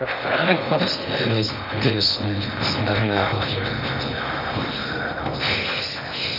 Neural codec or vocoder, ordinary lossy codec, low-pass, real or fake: codec, 16 kHz in and 24 kHz out, 0.6 kbps, FocalCodec, streaming, 2048 codes; none; 5.4 kHz; fake